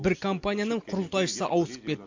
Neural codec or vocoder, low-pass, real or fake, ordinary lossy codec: none; 7.2 kHz; real; MP3, 48 kbps